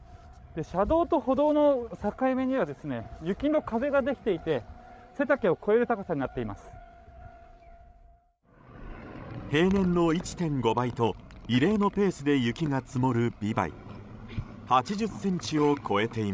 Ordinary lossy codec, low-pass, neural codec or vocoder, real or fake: none; none; codec, 16 kHz, 16 kbps, FreqCodec, larger model; fake